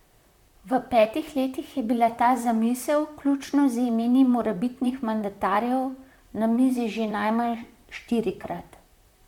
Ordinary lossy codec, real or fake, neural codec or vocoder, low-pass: MP3, 96 kbps; fake; vocoder, 44.1 kHz, 128 mel bands, Pupu-Vocoder; 19.8 kHz